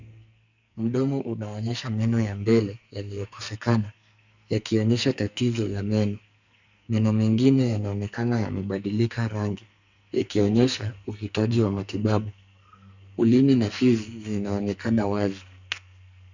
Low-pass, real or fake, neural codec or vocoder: 7.2 kHz; fake; codec, 44.1 kHz, 2.6 kbps, SNAC